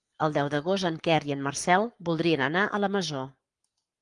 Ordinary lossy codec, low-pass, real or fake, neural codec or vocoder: Opus, 32 kbps; 10.8 kHz; fake; codec, 44.1 kHz, 7.8 kbps, Pupu-Codec